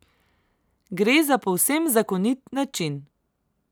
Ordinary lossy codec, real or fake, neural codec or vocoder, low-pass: none; real; none; none